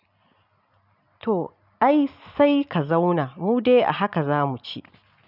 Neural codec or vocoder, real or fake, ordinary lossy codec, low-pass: none; real; none; 5.4 kHz